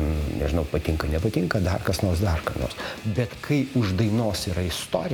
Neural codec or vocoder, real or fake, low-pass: none; real; 19.8 kHz